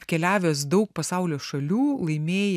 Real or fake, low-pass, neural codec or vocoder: real; 14.4 kHz; none